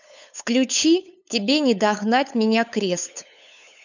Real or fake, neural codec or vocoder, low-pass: fake; codec, 16 kHz, 8 kbps, FunCodec, trained on LibriTTS, 25 frames a second; 7.2 kHz